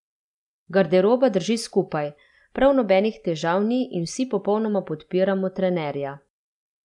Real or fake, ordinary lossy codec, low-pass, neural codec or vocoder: real; none; 10.8 kHz; none